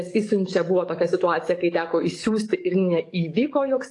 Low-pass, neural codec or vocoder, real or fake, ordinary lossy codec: 10.8 kHz; codec, 44.1 kHz, 7.8 kbps, DAC; fake; AAC, 48 kbps